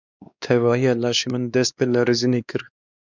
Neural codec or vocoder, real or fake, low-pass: codec, 16 kHz, 2 kbps, X-Codec, WavLM features, trained on Multilingual LibriSpeech; fake; 7.2 kHz